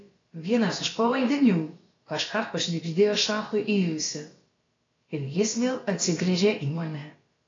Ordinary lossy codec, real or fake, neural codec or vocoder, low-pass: AAC, 32 kbps; fake; codec, 16 kHz, about 1 kbps, DyCAST, with the encoder's durations; 7.2 kHz